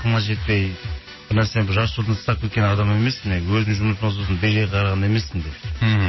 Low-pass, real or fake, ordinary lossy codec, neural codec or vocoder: 7.2 kHz; real; MP3, 24 kbps; none